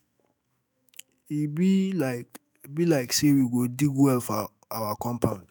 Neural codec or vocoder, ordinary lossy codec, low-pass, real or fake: autoencoder, 48 kHz, 128 numbers a frame, DAC-VAE, trained on Japanese speech; none; none; fake